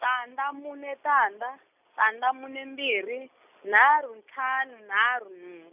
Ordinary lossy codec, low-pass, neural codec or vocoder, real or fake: none; 3.6 kHz; none; real